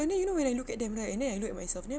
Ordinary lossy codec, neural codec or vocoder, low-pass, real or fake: none; none; none; real